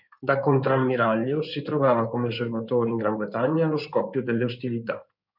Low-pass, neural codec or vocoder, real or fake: 5.4 kHz; codec, 16 kHz in and 24 kHz out, 2.2 kbps, FireRedTTS-2 codec; fake